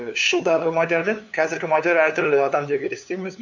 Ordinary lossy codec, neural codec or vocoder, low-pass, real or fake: none; codec, 16 kHz, 2 kbps, FunCodec, trained on LibriTTS, 25 frames a second; 7.2 kHz; fake